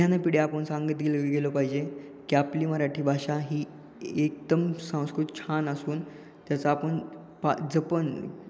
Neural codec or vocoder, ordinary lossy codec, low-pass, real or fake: none; none; none; real